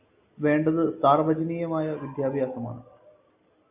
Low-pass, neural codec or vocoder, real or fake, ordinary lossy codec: 3.6 kHz; none; real; AAC, 32 kbps